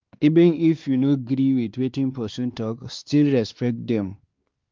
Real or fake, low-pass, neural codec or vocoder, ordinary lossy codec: fake; 7.2 kHz; codec, 16 kHz, 2 kbps, X-Codec, WavLM features, trained on Multilingual LibriSpeech; Opus, 24 kbps